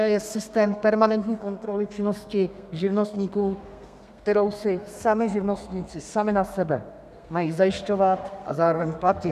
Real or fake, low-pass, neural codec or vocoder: fake; 14.4 kHz; codec, 32 kHz, 1.9 kbps, SNAC